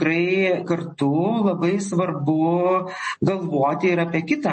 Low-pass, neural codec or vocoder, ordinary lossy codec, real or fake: 10.8 kHz; none; MP3, 32 kbps; real